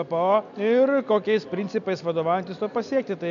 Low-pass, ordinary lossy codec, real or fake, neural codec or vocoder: 7.2 kHz; MP3, 64 kbps; real; none